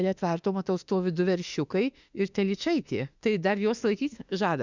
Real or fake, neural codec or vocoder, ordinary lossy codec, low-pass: fake; autoencoder, 48 kHz, 32 numbers a frame, DAC-VAE, trained on Japanese speech; Opus, 64 kbps; 7.2 kHz